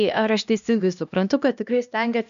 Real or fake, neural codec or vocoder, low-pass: fake; codec, 16 kHz, 1 kbps, X-Codec, HuBERT features, trained on LibriSpeech; 7.2 kHz